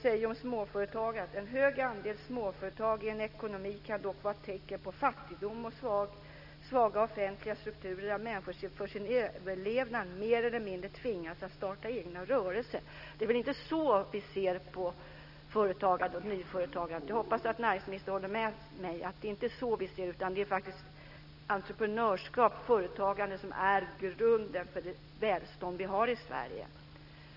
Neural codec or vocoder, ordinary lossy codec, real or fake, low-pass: none; none; real; 5.4 kHz